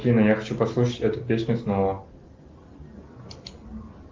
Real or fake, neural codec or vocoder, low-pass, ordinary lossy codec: real; none; 7.2 kHz; Opus, 32 kbps